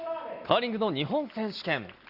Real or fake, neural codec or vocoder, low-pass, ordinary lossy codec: real; none; 5.4 kHz; Opus, 64 kbps